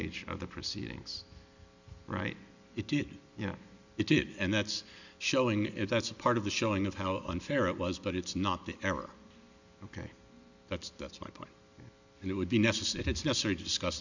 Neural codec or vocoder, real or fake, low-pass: none; real; 7.2 kHz